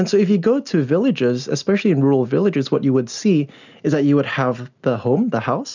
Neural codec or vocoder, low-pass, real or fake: none; 7.2 kHz; real